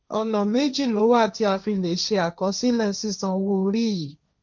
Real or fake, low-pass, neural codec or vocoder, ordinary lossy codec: fake; 7.2 kHz; codec, 16 kHz, 1.1 kbps, Voila-Tokenizer; none